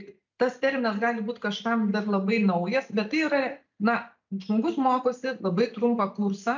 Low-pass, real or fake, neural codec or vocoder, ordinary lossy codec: 7.2 kHz; real; none; MP3, 64 kbps